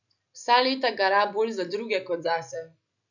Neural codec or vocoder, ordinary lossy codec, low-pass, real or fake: none; none; 7.2 kHz; real